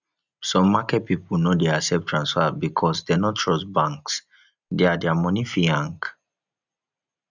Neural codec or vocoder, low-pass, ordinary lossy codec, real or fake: none; 7.2 kHz; none; real